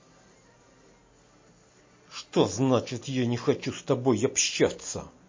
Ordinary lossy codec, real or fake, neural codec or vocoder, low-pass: MP3, 32 kbps; real; none; 7.2 kHz